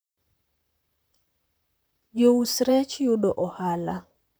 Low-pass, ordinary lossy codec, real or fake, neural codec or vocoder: none; none; fake; vocoder, 44.1 kHz, 128 mel bands, Pupu-Vocoder